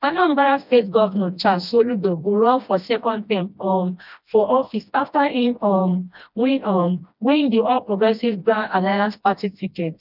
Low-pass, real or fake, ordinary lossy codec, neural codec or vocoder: 5.4 kHz; fake; none; codec, 16 kHz, 1 kbps, FreqCodec, smaller model